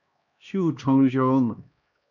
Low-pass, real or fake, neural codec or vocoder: 7.2 kHz; fake; codec, 16 kHz, 1 kbps, X-Codec, HuBERT features, trained on LibriSpeech